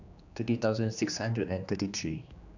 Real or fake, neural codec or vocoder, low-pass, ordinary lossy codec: fake; codec, 16 kHz, 2 kbps, X-Codec, HuBERT features, trained on general audio; 7.2 kHz; none